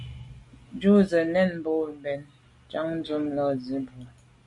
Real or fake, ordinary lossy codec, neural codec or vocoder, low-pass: real; MP3, 64 kbps; none; 10.8 kHz